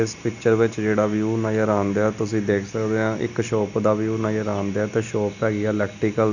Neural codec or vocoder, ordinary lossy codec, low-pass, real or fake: none; none; 7.2 kHz; real